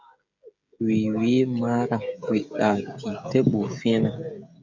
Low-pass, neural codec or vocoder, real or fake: 7.2 kHz; codec, 16 kHz, 16 kbps, FreqCodec, smaller model; fake